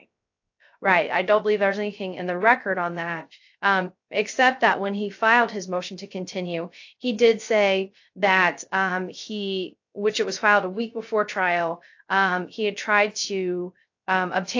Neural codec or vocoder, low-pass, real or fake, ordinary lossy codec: codec, 16 kHz, 0.3 kbps, FocalCodec; 7.2 kHz; fake; AAC, 48 kbps